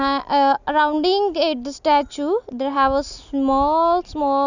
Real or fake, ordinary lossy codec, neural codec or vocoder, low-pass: real; none; none; 7.2 kHz